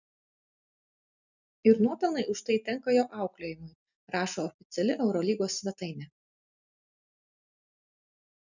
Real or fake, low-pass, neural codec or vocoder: real; 7.2 kHz; none